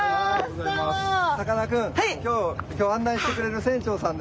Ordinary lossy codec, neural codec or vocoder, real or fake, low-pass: none; none; real; none